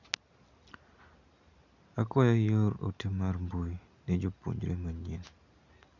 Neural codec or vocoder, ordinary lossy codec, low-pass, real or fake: none; none; 7.2 kHz; real